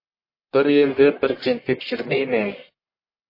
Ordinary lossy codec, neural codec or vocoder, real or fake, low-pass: MP3, 24 kbps; codec, 44.1 kHz, 1.7 kbps, Pupu-Codec; fake; 5.4 kHz